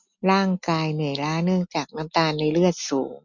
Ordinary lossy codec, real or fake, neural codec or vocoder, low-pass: none; real; none; 7.2 kHz